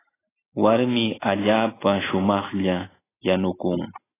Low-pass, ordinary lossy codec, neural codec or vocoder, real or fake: 3.6 kHz; AAC, 16 kbps; none; real